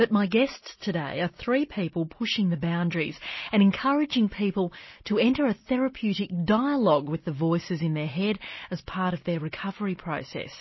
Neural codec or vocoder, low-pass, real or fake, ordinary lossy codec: none; 7.2 kHz; real; MP3, 24 kbps